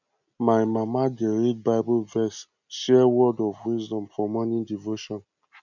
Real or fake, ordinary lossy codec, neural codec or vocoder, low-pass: real; none; none; 7.2 kHz